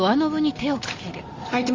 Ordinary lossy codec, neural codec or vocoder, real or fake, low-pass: Opus, 32 kbps; none; real; 7.2 kHz